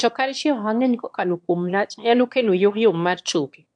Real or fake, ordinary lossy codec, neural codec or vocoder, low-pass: fake; MP3, 64 kbps; autoencoder, 22.05 kHz, a latent of 192 numbers a frame, VITS, trained on one speaker; 9.9 kHz